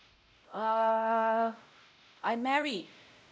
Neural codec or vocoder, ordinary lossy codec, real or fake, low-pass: codec, 16 kHz, 0.5 kbps, X-Codec, WavLM features, trained on Multilingual LibriSpeech; none; fake; none